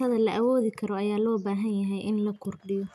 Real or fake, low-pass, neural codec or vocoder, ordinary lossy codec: real; 14.4 kHz; none; none